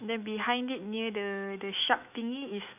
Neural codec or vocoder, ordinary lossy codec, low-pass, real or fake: autoencoder, 48 kHz, 128 numbers a frame, DAC-VAE, trained on Japanese speech; none; 3.6 kHz; fake